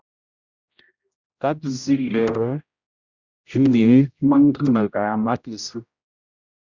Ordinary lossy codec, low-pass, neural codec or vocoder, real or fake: Opus, 64 kbps; 7.2 kHz; codec, 16 kHz, 0.5 kbps, X-Codec, HuBERT features, trained on general audio; fake